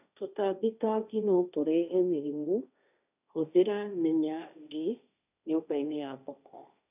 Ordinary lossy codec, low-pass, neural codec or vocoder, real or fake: none; 3.6 kHz; codec, 16 kHz, 1.1 kbps, Voila-Tokenizer; fake